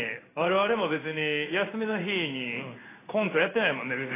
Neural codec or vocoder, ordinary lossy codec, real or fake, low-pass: codec, 16 kHz in and 24 kHz out, 1 kbps, XY-Tokenizer; AAC, 16 kbps; fake; 3.6 kHz